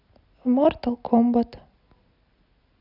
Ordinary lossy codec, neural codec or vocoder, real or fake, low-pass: none; none; real; 5.4 kHz